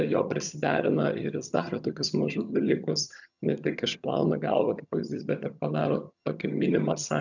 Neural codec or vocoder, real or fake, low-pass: vocoder, 22.05 kHz, 80 mel bands, HiFi-GAN; fake; 7.2 kHz